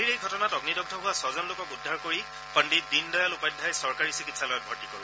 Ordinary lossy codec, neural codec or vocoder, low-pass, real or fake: none; none; none; real